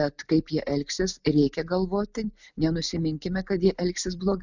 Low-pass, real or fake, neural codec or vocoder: 7.2 kHz; real; none